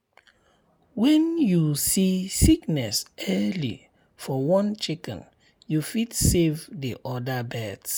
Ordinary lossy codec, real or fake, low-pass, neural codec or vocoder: none; real; none; none